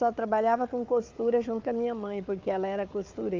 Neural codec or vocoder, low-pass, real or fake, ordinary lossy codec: codec, 16 kHz, 4 kbps, FunCodec, trained on Chinese and English, 50 frames a second; 7.2 kHz; fake; Opus, 24 kbps